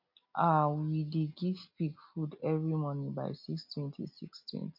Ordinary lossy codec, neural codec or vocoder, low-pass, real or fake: MP3, 48 kbps; none; 5.4 kHz; real